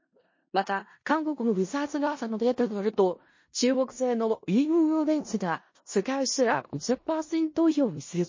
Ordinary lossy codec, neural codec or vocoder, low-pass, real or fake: MP3, 32 kbps; codec, 16 kHz in and 24 kHz out, 0.4 kbps, LongCat-Audio-Codec, four codebook decoder; 7.2 kHz; fake